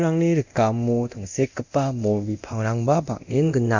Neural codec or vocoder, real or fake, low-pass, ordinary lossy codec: codec, 24 kHz, 0.9 kbps, DualCodec; fake; 7.2 kHz; Opus, 64 kbps